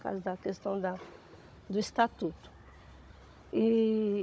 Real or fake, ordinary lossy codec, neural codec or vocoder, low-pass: fake; none; codec, 16 kHz, 16 kbps, FunCodec, trained on Chinese and English, 50 frames a second; none